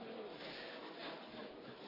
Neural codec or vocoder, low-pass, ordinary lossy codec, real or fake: none; 5.4 kHz; AAC, 24 kbps; real